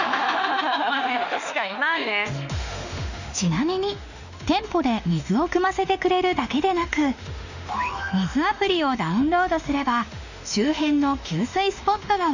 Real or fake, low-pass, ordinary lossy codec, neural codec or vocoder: fake; 7.2 kHz; none; autoencoder, 48 kHz, 32 numbers a frame, DAC-VAE, trained on Japanese speech